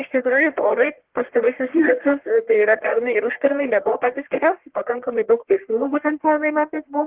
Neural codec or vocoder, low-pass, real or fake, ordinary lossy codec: codec, 44.1 kHz, 1.7 kbps, Pupu-Codec; 3.6 kHz; fake; Opus, 16 kbps